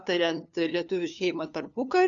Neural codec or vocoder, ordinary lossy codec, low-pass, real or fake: codec, 16 kHz, 2 kbps, FunCodec, trained on LibriTTS, 25 frames a second; MP3, 96 kbps; 7.2 kHz; fake